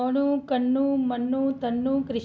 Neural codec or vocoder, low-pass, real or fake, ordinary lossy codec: none; none; real; none